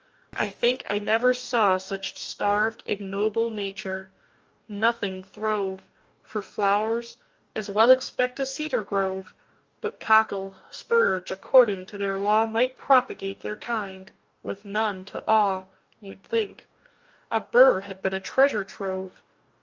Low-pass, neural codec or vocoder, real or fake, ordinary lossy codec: 7.2 kHz; codec, 44.1 kHz, 2.6 kbps, DAC; fake; Opus, 24 kbps